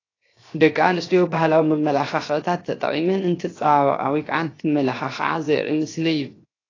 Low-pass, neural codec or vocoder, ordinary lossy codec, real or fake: 7.2 kHz; codec, 16 kHz, 0.7 kbps, FocalCodec; AAC, 32 kbps; fake